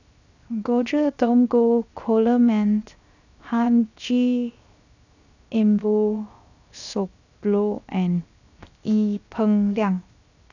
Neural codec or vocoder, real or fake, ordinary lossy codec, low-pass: codec, 16 kHz, 0.7 kbps, FocalCodec; fake; none; 7.2 kHz